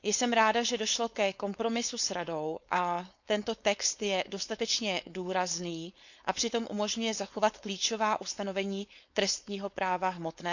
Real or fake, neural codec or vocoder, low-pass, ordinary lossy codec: fake; codec, 16 kHz, 4.8 kbps, FACodec; 7.2 kHz; none